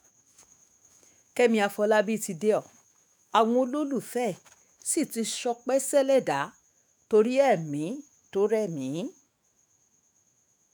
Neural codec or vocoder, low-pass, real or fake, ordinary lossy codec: autoencoder, 48 kHz, 128 numbers a frame, DAC-VAE, trained on Japanese speech; none; fake; none